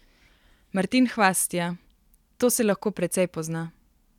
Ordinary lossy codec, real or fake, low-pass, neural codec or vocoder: none; real; 19.8 kHz; none